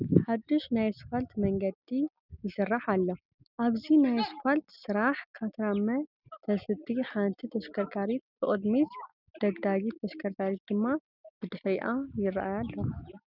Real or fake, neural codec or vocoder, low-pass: real; none; 5.4 kHz